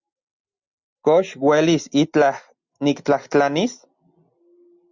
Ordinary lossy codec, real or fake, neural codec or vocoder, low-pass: Opus, 64 kbps; real; none; 7.2 kHz